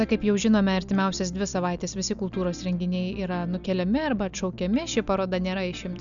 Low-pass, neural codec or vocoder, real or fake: 7.2 kHz; none; real